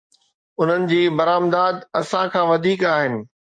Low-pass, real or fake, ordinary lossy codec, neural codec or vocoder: 9.9 kHz; fake; MP3, 48 kbps; codec, 44.1 kHz, 7.8 kbps, DAC